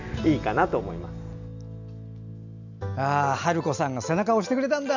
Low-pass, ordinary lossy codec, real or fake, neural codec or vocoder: 7.2 kHz; none; real; none